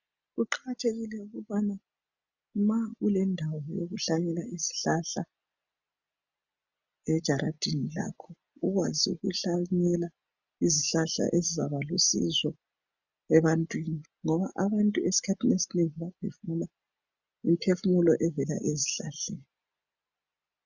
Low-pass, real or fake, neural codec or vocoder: 7.2 kHz; real; none